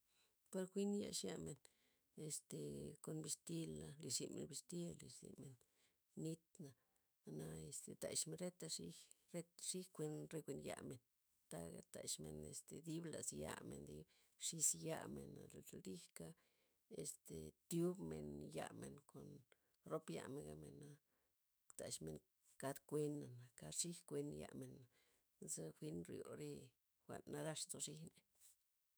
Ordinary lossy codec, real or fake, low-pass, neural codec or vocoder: none; fake; none; autoencoder, 48 kHz, 128 numbers a frame, DAC-VAE, trained on Japanese speech